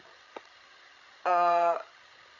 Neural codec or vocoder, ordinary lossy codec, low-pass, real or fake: codec, 16 kHz, 16 kbps, FreqCodec, larger model; AAC, 48 kbps; 7.2 kHz; fake